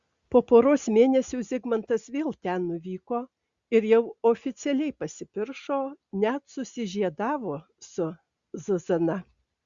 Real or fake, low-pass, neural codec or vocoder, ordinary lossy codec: real; 7.2 kHz; none; Opus, 64 kbps